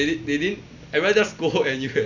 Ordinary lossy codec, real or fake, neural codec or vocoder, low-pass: none; real; none; 7.2 kHz